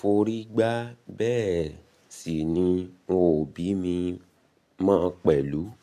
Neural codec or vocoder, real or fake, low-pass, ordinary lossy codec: none; real; 14.4 kHz; none